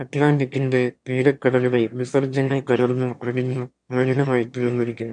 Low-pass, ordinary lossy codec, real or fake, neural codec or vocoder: 9.9 kHz; MP3, 64 kbps; fake; autoencoder, 22.05 kHz, a latent of 192 numbers a frame, VITS, trained on one speaker